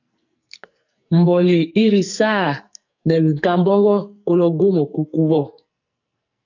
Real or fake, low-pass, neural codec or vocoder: fake; 7.2 kHz; codec, 44.1 kHz, 2.6 kbps, SNAC